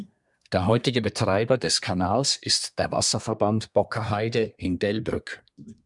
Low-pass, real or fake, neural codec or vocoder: 10.8 kHz; fake; codec, 24 kHz, 1 kbps, SNAC